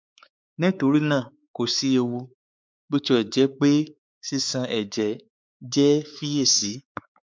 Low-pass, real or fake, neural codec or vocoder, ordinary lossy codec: none; fake; codec, 16 kHz, 4 kbps, X-Codec, WavLM features, trained on Multilingual LibriSpeech; none